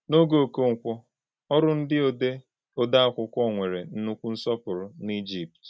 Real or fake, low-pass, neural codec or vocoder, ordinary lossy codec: real; none; none; none